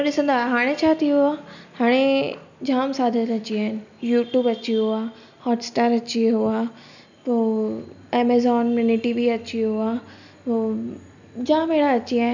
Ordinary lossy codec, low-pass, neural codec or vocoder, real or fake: none; 7.2 kHz; none; real